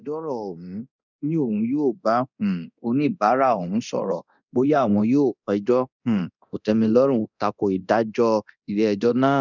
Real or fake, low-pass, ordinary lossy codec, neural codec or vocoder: fake; 7.2 kHz; none; codec, 24 kHz, 0.9 kbps, DualCodec